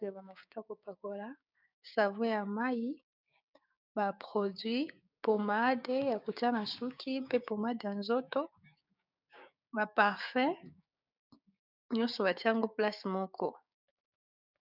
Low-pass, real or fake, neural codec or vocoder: 5.4 kHz; fake; codec, 16 kHz, 6 kbps, DAC